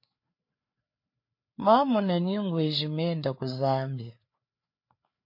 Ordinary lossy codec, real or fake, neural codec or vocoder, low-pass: MP3, 32 kbps; fake; codec, 16 kHz, 4 kbps, FreqCodec, larger model; 5.4 kHz